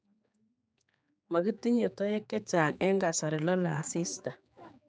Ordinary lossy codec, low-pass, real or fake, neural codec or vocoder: none; none; fake; codec, 16 kHz, 4 kbps, X-Codec, HuBERT features, trained on general audio